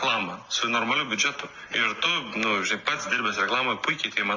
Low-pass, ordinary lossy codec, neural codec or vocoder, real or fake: 7.2 kHz; AAC, 48 kbps; none; real